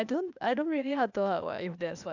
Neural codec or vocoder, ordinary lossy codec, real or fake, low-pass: codec, 16 kHz, 0.8 kbps, ZipCodec; none; fake; 7.2 kHz